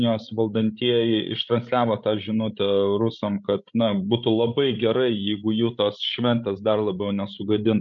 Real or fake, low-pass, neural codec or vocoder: fake; 7.2 kHz; codec, 16 kHz, 16 kbps, FreqCodec, larger model